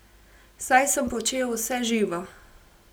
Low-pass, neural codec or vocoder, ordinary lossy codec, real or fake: none; none; none; real